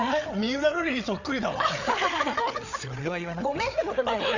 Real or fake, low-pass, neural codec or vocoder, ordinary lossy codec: fake; 7.2 kHz; codec, 16 kHz, 8 kbps, FreqCodec, larger model; none